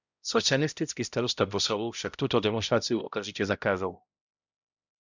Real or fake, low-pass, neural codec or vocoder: fake; 7.2 kHz; codec, 16 kHz, 0.5 kbps, X-Codec, HuBERT features, trained on balanced general audio